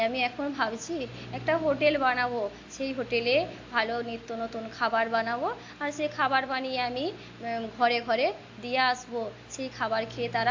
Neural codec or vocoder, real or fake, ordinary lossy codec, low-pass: none; real; AAC, 48 kbps; 7.2 kHz